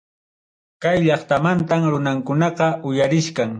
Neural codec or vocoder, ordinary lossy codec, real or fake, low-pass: none; AAC, 64 kbps; real; 9.9 kHz